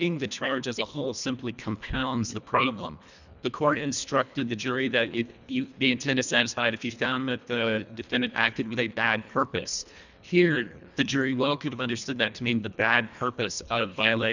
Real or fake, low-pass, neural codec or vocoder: fake; 7.2 kHz; codec, 24 kHz, 1.5 kbps, HILCodec